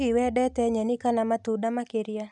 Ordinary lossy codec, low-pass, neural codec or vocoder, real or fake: none; 10.8 kHz; none; real